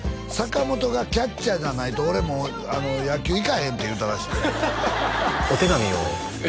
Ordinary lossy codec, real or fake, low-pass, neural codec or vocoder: none; real; none; none